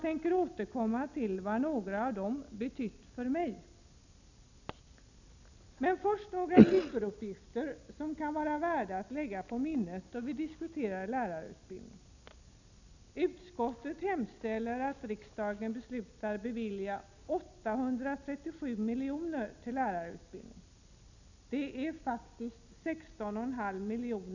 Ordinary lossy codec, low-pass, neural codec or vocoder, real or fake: none; 7.2 kHz; none; real